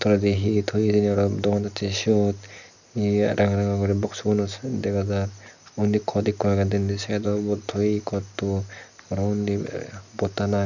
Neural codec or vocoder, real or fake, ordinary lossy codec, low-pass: none; real; none; 7.2 kHz